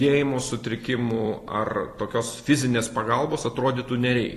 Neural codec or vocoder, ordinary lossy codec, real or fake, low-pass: vocoder, 44.1 kHz, 128 mel bands every 256 samples, BigVGAN v2; AAC, 48 kbps; fake; 14.4 kHz